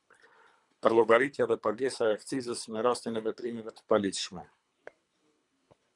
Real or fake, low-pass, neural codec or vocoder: fake; 10.8 kHz; codec, 24 kHz, 3 kbps, HILCodec